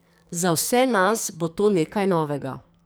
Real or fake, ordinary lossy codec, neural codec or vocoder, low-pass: fake; none; codec, 44.1 kHz, 2.6 kbps, SNAC; none